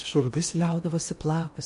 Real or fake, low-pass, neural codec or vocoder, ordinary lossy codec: fake; 10.8 kHz; codec, 16 kHz in and 24 kHz out, 0.8 kbps, FocalCodec, streaming, 65536 codes; MP3, 48 kbps